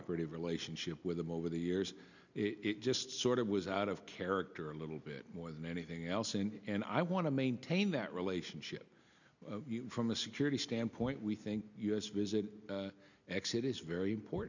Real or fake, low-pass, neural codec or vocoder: real; 7.2 kHz; none